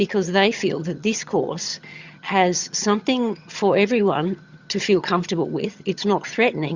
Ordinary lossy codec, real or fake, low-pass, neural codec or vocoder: Opus, 64 kbps; fake; 7.2 kHz; vocoder, 22.05 kHz, 80 mel bands, HiFi-GAN